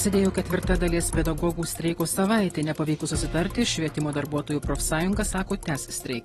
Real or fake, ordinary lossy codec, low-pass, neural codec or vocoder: fake; AAC, 32 kbps; 19.8 kHz; vocoder, 44.1 kHz, 128 mel bands every 256 samples, BigVGAN v2